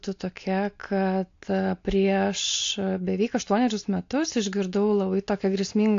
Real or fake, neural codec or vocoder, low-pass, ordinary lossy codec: real; none; 7.2 kHz; AAC, 48 kbps